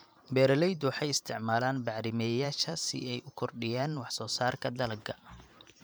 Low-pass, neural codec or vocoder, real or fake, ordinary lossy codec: none; vocoder, 44.1 kHz, 128 mel bands every 512 samples, BigVGAN v2; fake; none